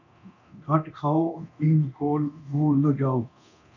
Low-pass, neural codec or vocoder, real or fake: 7.2 kHz; codec, 24 kHz, 0.9 kbps, DualCodec; fake